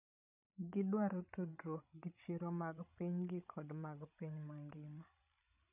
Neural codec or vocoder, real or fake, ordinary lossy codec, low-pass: codec, 16 kHz, 16 kbps, FreqCodec, larger model; fake; none; 3.6 kHz